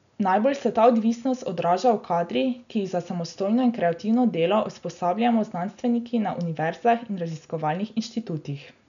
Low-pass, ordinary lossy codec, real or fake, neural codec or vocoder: 7.2 kHz; none; real; none